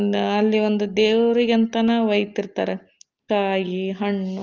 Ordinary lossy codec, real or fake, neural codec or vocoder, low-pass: Opus, 24 kbps; real; none; 7.2 kHz